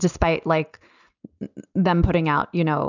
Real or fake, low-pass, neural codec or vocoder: real; 7.2 kHz; none